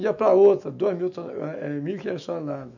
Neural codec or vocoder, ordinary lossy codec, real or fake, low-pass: autoencoder, 48 kHz, 128 numbers a frame, DAC-VAE, trained on Japanese speech; none; fake; 7.2 kHz